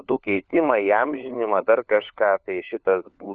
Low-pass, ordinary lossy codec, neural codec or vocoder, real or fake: 7.2 kHz; MP3, 96 kbps; codec, 16 kHz, 4 kbps, FunCodec, trained on LibriTTS, 50 frames a second; fake